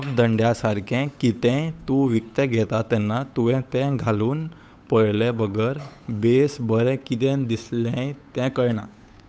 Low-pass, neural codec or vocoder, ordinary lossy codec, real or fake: none; codec, 16 kHz, 8 kbps, FunCodec, trained on Chinese and English, 25 frames a second; none; fake